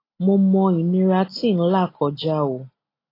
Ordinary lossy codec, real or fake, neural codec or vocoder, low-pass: AAC, 24 kbps; real; none; 5.4 kHz